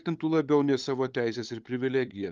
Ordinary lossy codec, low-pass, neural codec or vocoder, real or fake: Opus, 32 kbps; 7.2 kHz; codec, 16 kHz, 8 kbps, FunCodec, trained on LibriTTS, 25 frames a second; fake